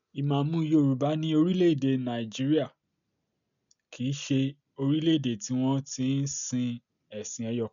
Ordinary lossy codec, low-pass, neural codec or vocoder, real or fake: none; 7.2 kHz; none; real